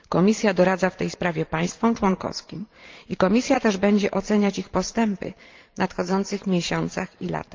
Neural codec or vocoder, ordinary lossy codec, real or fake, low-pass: vocoder, 44.1 kHz, 128 mel bands every 512 samples, BigVGAN v2; Opus, 32 kbps; fake; 7.2 kHz